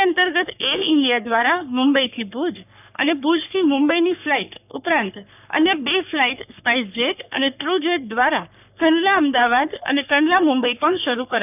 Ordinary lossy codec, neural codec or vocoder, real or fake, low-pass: none; codec, 44.1 kHz, 3.4 kbps, Pupu-Codec; fake; 3.6 kHz